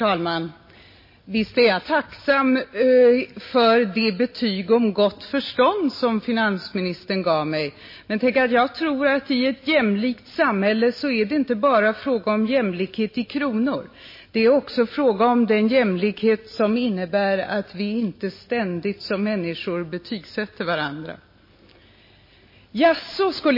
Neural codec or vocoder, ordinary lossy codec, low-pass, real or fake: none; MP3, 24 kbps; 5.4 kHz; real